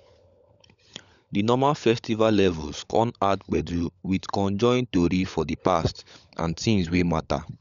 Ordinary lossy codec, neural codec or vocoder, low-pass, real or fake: none; codec, 16 kHz, 16 kbps, FunCodec, trained on LibriTTS, 50 frames a second; 7.2 kHz; fake